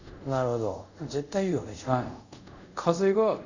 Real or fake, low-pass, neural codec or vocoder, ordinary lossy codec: fake; 7.2 kHz; codec, 24 kHz, 0.5 kbps, DualCodec; none